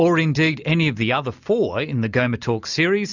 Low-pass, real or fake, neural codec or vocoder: 7.2 kHz; real; none